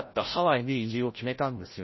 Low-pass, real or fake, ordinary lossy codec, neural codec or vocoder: 7.2 kHz; fake; MP3, 24 kbps; codec, 16 kHz, 0.5 kbps, FreqCodec, larger model